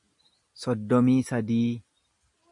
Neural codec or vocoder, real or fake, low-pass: none; real; 10.8 kHz